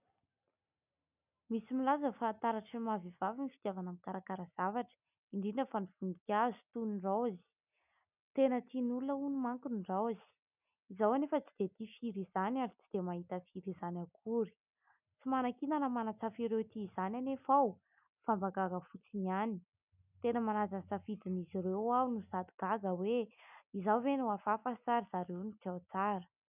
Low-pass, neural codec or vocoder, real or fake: 3.6 kHz; none; real